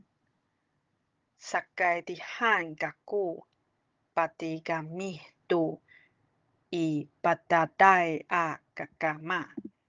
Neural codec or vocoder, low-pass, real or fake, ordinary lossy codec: codec, 16 kHz, 16 kbps, FunCodec, trained on Chinese and English, 50 frames a second; 7.2 kHz; fake; Opus, 32 kbps